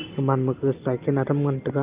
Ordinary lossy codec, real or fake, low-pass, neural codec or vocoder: Opus, 32 kbps; real; 3.6 kHz; none